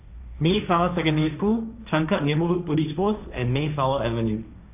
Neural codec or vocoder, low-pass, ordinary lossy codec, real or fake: codec, 16 kHz, 1.1 kbps, Voila-Tokenizer; 3.6 kHz; none; fake